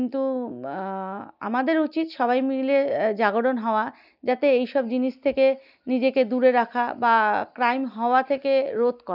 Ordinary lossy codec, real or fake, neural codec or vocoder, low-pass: none; real; none; 5.4 kHz